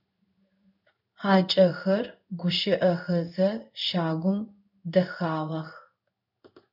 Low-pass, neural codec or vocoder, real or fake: 5.4 kHz; codec, 16 kHz in and 24 kHz out, 1 kbps, XY-Tokenizer; fake